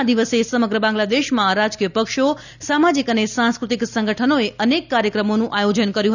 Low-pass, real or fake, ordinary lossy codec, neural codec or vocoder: 7.2 kHz; real; none; none